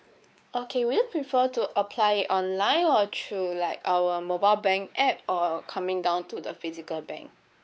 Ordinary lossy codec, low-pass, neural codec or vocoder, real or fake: none; none; codec, 16 kHz, 4 kbps, X-Codec, WavLM features, trained on Multilingual LibriSpeech; fake